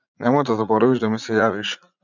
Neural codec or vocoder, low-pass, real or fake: vocoder, 44.1 kHz, 80 mel bands, Vocos; 7.2 kHz; fake